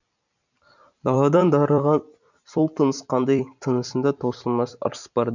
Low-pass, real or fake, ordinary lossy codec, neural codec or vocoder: 7.2 kHz; fake; none; vocoder, 44.1 kHz, 128 mel bands every 256 samples, BigVGAN v2